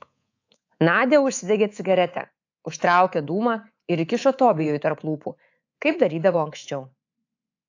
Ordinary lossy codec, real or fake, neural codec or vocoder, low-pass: AAC, 48 kbps; fake; codec, 24 kHz, 3.1 kbps, DualCodec; 7.2 kHz